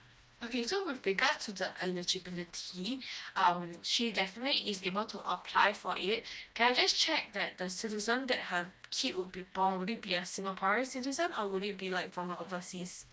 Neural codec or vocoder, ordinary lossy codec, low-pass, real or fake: codec, 16 kHz, 1 kbps, FreqCodec, smaller model; none; none; fake